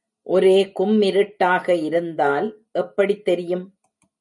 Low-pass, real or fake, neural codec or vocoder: 10.8 kHz; real; none